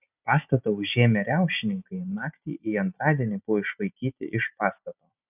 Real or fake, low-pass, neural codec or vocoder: real; 3.6 kHz; none